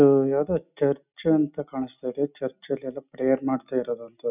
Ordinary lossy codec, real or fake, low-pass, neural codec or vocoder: none; real; 3.6 kHz; none